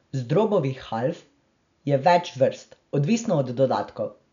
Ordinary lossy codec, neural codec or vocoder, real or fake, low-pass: none; none; real; 7.2 kHz